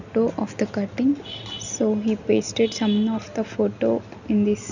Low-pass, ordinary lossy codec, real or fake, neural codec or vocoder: 7.2 kHz; none; real; none